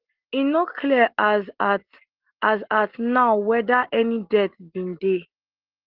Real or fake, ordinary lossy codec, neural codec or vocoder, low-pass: real; Opus, 16 kbps; none; 5.4 kHz